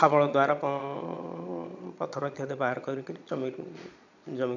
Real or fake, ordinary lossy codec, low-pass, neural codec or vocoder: fake; none; 7.2 kHz; vocoder, 22.05 kHz, 80 mel bands, Vocos